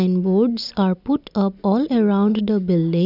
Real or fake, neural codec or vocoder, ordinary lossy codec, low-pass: real; none; none; 5.4 kHz